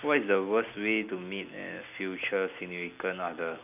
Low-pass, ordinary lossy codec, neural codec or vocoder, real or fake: 3.6 kHz; none; none; real